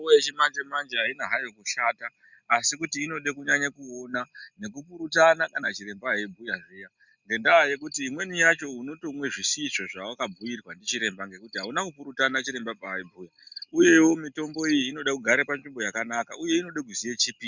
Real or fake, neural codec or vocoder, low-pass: real; none; 7.2 kHz